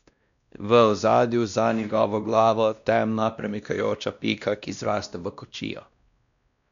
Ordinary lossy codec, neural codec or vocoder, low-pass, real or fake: none; codec, 16 kHz, 1 kbps, X-Codec, WavLM features, trained on Multilingual LibriSpeech; 7.2 kHz; fake